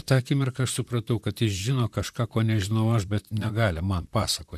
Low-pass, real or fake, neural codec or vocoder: 14.4 kHz; fake; vocoder, 44.1 kHz, 128 mel bands, Pupu-Vocoder